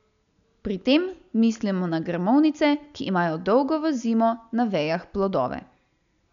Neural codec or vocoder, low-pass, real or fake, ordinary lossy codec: none; 7.2 kHz; real; none